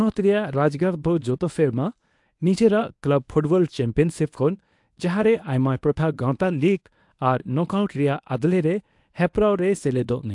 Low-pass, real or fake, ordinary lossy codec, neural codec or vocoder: 10.8 kHz; fake; AAC, 64 kbps; codec, 24 kHz, 0.9 kbps, WavTokenizer, medium speech release version 1